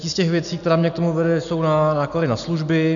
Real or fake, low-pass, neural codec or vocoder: real; 7.2 kHz; none